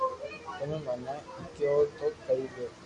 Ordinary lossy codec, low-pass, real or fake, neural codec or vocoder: MP3, 48 kbps; 10.8 kHz; real; none